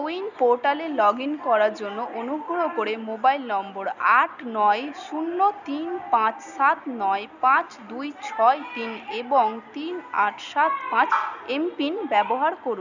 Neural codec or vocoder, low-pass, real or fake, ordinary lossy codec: none; 7.2 kHz; real; none